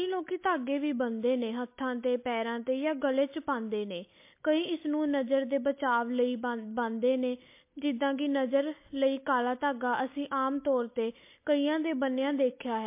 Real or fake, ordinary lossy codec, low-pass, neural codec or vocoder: real; MP3, 24 kbps; 3.6 kHz; none